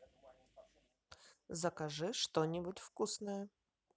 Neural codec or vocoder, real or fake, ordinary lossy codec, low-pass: none; real; none; none